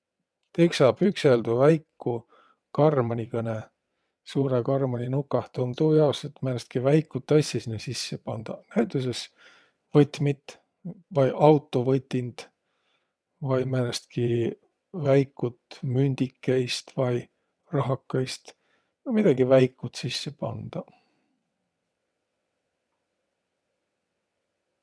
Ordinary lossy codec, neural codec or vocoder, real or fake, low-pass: none; vocoder, 22.05 kHz, 80 mel bands, WaveNeXt; fake; none